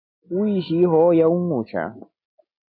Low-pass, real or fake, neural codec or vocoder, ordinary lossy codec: 5.4 kHz; real; none; MP3, 32 kbps